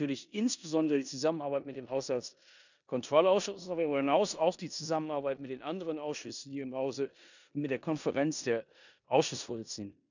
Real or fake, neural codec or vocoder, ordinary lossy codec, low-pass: fake; codec, 16 kHz in and 24 kHz out, 0.9 kbps, LongCat-Audio-Codec, four codebook decoder; none; 7.2 kHz